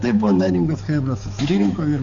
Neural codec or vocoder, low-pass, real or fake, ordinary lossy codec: codec, 16 kHz, 4 kbps, X-Codec, HuBERT features, trained on balanced general audio; 7.2 kHz; fake; AAC, 64 kbps